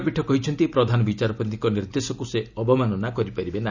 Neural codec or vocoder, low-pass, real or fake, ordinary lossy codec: none; 7.2 kHz; real; none